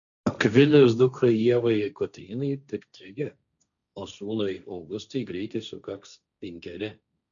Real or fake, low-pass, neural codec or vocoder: fake; 7.2 kHz; codec, 16 kHz, 1.1 kbps, Voila-Tokenizer